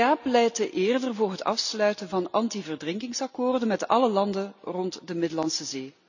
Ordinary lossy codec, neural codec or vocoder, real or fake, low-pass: none; none; real; 7.2 kHz